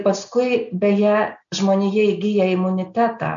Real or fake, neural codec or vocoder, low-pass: real; none; 7.2 kHz